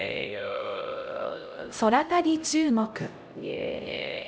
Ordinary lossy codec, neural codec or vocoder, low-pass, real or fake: none; codec, 16 kHz, 0.5 kbps, X-Codec, HuBERT features, trained on LibriSpeech; none; fake